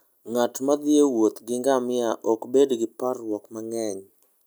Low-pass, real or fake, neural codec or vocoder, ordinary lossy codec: none; real; none; none